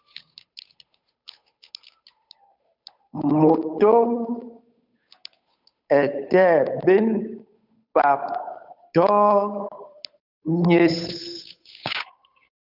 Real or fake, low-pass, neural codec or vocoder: fake; 5.4 kHz; codec, 16 kHz, 8 kbps, FunCodec, trained on Chinese and English, 25 frames a second